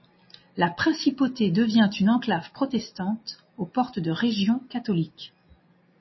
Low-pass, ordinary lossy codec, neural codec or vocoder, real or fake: 7.2 kHz; MP3, 24 kbps; none; real